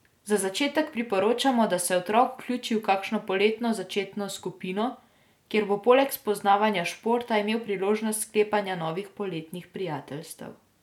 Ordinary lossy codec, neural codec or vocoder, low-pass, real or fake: none; none; 19.8 kHz; real